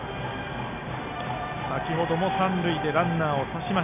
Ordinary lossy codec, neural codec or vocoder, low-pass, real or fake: none; none; 3.6 kHz; real